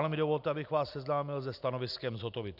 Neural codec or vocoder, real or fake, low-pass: none; real; 5.4 kHz